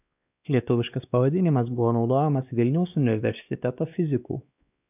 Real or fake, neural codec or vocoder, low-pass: fake; codec, 16 kHz, 2 kbps, X-Codec, WavLM features, trained on Multilingual LibriSpeech; 3.6 kHz